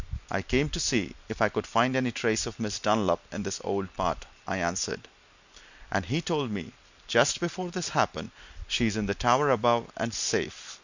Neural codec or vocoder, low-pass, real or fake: none; 7.2 kHz; real